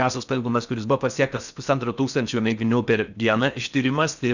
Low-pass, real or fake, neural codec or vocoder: 7.2 kHz; fake; codec, 16 kHz in and 24 kHz out, 0.6 kbps, FocalCodec, streaming, 4096 codes